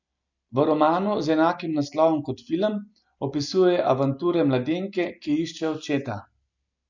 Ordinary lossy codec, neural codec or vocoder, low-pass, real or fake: none; none; 7.2 kHz; real